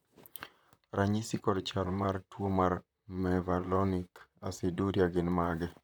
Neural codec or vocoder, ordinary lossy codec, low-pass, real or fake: vocoder, 44.1 kHz, 128 mel bands, Pupu-Vocoder; none; none; fake